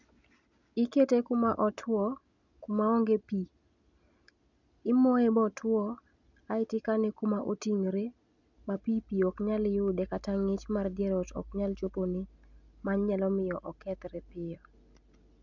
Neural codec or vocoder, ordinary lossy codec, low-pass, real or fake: none; none; 7.2 kHz; real